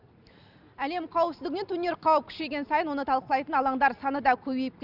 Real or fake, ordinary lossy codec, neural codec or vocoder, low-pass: real; none; none; 5.4 kHz